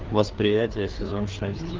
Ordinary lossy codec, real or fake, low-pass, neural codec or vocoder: Opus, 16 kbps; fake; 7.2 kHz; codec, 16 kHz, 4 kbps, FreqCodec, larger model